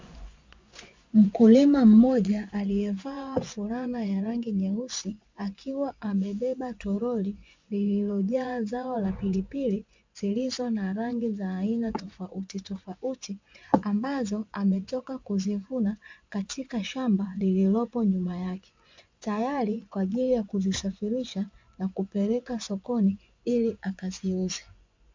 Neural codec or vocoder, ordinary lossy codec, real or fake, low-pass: vocoder, 24 kHz, 100 mel bands, Vocos; MP3, 64 kbps; fake; 7.2 kHz